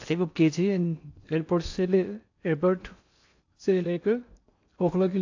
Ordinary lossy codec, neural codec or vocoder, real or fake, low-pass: AAC, 48 kbps; codec, 16 kHz in and 24 kHz out, 0.6 kbps, FocalCodec, streaming, 4096 codes; fake; 7.2 kHz